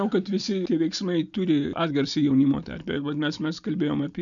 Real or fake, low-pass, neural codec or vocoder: real; 7.2 kHz; none